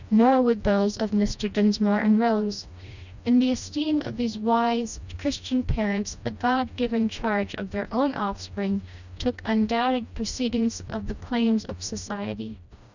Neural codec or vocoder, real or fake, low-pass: codec, 16 kHz, 1 kbps, FreqCodec, smaller model; fake; 7.2 kHz